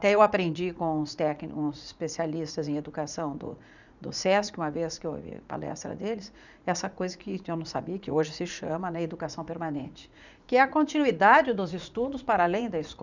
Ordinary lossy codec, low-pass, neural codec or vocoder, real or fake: none; 7.2 kHz; vocoder, 44.1 kHz, 80 mel bands, Vocos; fake